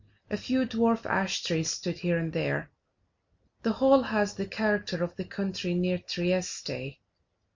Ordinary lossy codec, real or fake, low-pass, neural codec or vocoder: MP3, 48 kbps; real; 7.2 kHz; none